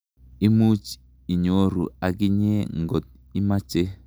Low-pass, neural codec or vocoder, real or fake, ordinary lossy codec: none; none; real; none